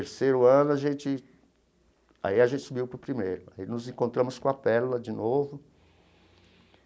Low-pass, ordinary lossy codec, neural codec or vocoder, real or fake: none; none; none; real